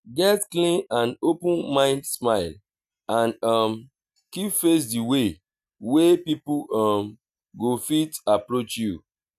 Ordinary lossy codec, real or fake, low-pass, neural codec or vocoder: none; real; 14.4 kHz; none